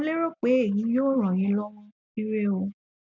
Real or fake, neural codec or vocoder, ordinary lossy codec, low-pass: real; none; none; 7.2 kHz